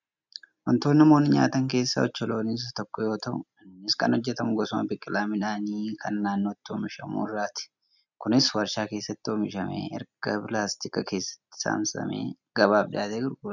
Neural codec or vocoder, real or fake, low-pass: none; real; 7.2 kHz